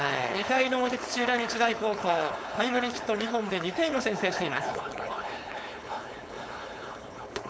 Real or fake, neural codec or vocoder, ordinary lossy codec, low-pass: fake; codec, 16 kHz, 4.8 kbps, FACodec; none; none